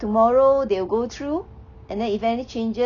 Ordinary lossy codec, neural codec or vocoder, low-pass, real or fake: none; none; 7.2 kHz; real